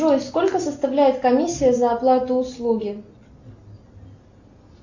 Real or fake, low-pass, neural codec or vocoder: real; 7.2 kHz; none